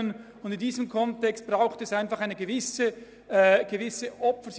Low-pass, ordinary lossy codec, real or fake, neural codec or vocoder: none; none; real; none